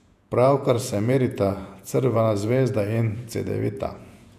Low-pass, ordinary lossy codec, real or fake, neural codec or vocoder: 14.4 kHz; none; fake; vocoder, 48 kHz, 128 mel bands, Vocos